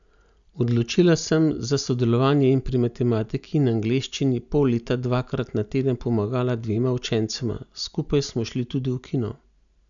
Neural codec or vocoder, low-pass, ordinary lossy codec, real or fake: none; 7.2 kHz; none; real